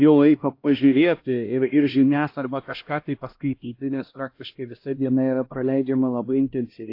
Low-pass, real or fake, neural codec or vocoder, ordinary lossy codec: 5.4 kHz; fake; codec, 16 kHz, 1 kbps, X-Codec, HuBERT features, trained on LibriSpeech; AAC, 32 kbps